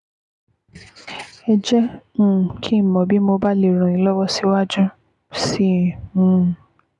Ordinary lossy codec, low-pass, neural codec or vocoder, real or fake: none; 10.8 kHz; none; real